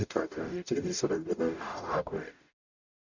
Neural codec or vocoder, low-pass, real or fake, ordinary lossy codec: codec, 44.1 kHz, 0.9 kbps, DAC; 7.2 kHz; fake; none